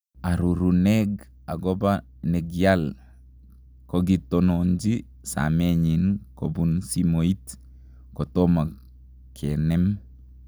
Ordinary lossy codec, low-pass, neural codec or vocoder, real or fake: none; none; none; real